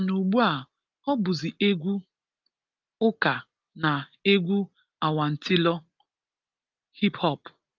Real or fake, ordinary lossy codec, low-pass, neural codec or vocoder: real; Opus, 32 kbps; 7.2 kHz; none